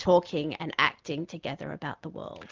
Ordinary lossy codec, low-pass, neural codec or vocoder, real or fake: Opus, 32 kbps; 7.2 kHz; none; real